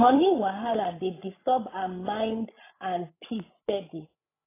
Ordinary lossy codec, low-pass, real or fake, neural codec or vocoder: AAC, 24 kbps; 3.6 kHz; fake; codec, 16 kHz, 8 kbps, FreqCodec, larger model